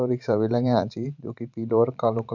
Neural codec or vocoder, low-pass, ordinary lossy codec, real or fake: vocoder, 44.1 kHz, 128 mel bands every 512 samples, BigVGAN v2; 7.2 kHz; none; fake